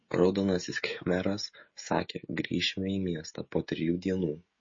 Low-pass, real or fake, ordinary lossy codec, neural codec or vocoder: 7.2 kHz; fake; MP3, 32 kbps; codec, 16 kHz, 16 kbps, FreqCodec, smaller model